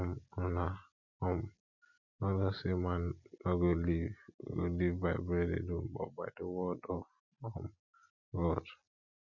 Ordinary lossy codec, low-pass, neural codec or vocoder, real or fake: none; 7.2 kHz; none; real